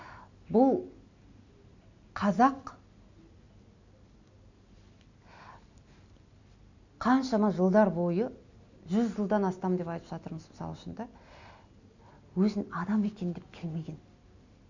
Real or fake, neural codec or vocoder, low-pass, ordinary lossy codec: real; none; 7.2 kHz; none